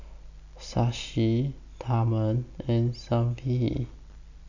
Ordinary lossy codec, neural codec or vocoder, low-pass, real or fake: none; none; 7.2 kHz; real